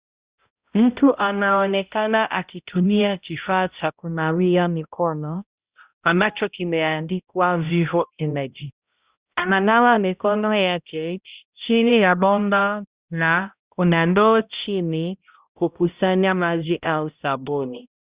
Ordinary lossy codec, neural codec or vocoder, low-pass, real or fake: Opus, 64 kbps; codec, 16 kHz, 0.5 kbps, X-Codec, HuBERT features, trained on balanced general audio; 3.6 kHz; fake